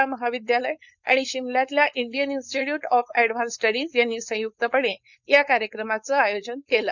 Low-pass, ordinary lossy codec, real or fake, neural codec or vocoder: 7.2 kHz; none; fake; codec, 16 kHz, 4.8 kbps, FACodec